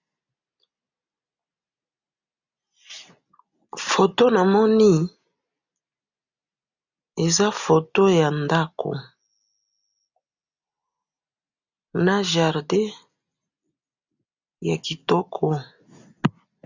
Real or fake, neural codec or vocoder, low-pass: real; none; 7.2 kHz